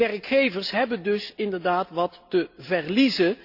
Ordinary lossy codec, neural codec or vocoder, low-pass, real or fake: Opus, 64 kbps; none; 5.4 kHz; real